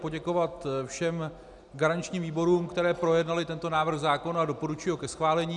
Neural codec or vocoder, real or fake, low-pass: none; real; 10.8 kHz